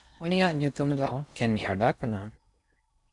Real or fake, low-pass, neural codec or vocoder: fake; 10.8 kHz; codec, 16 kHz in and 24 kHz out, 0.8 kbps, FocalCodec, streaming, 65536 codes